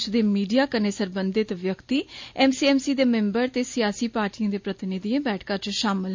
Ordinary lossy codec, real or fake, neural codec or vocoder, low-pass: MP3, 48 kbps; real; none; 7.2 kHz